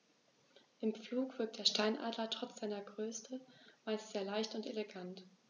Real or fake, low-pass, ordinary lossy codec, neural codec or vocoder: real; 7.2 kHz; none; none